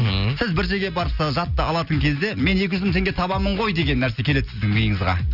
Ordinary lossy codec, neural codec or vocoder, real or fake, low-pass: none; none; real; 5.4 kHz